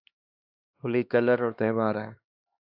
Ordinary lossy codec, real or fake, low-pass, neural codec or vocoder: AAC, 48 kbps; fake; 5.4 kHz; codec, 16 kHz, 2 kbps, X-Codec, HuBERT features, trained on LibriSpeech